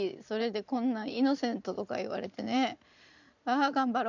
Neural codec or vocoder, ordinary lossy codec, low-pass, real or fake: vocoder, 44.1 kHz, 128 mel bands every 512 samples, BigVGAN v2; none; 7.2 kHz; fake